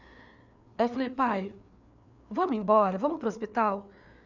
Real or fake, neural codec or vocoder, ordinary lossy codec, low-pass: fake; codec, 16 kHz, 4 kbps, FreqCodec, larger model; none; 7.2 kHz